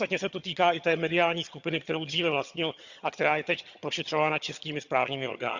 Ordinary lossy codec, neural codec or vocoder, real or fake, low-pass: Opus, 64 kbps; vocoder, 22.05 kHz, 80 mel bands, HiFi-GAN; fake; 7.2 kHz